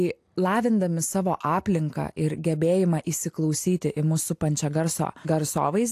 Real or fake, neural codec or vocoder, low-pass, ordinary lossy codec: real; none; 14.4 kHz; AAC, 64 kbps